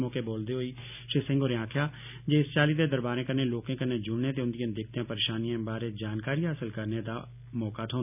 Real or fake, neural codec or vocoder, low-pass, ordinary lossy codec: real; none; 3.6 kHz; none